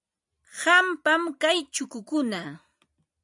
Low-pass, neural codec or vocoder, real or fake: 10.8 kHz; none; real